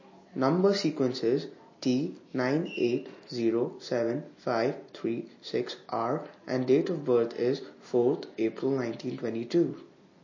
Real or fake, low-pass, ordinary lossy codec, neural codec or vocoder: real; 7.2 kHz; MP3, 32 kbps; none